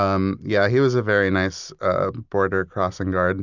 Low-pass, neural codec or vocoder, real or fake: 7.2 kHz; none; real